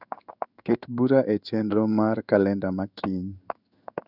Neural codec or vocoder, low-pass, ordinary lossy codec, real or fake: codec, 16 kHz in and 24 kHz out, 1 kbps, XY-Tokenizer; 5.4 kHz; none; fake